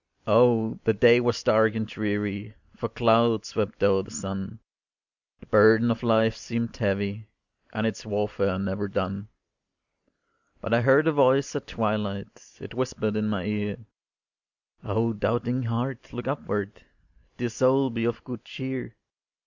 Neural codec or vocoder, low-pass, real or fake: none; 7.2 kHz; real